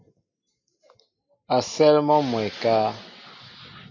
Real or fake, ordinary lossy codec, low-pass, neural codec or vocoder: real; MP3, 48 kbps; 7.2 kHz; none